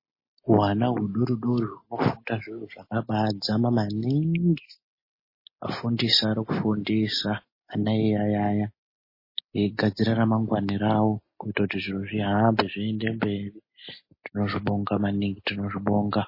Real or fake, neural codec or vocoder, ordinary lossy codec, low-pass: real; none; MP3, 24 kbps; 5.4 kHz